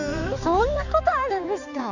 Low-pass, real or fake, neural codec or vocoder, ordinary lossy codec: 7.2 kHz; fake; codec, 16 kHz, 4 kbps, X-Codec, HuBERT features, trained on balanced general audio; none